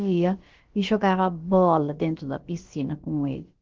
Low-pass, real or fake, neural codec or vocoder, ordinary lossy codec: 7.2 kHz; fake; codec, 16 kHz, about 1 kbps, DyCAST, with the encoder's durations; Opus, 16 kbps